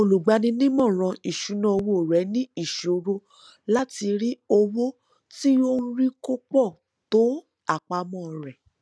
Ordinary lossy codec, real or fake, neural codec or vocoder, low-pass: none; real; none; none